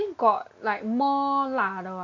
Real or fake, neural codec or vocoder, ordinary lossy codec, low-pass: real; none; AAC, 48 kbps; 7.2 kHz